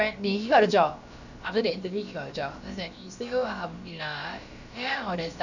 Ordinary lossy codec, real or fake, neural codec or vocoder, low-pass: none; fake; codec, 16 kHz, about 1 kbps, DyCAST, with the encoder's durations; 7.2 kHz